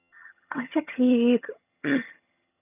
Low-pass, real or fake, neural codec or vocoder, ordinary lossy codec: 3.6 kHz; fake; vocoder, 22.05 kHz, 80 mel bands, HiFi-GAN; none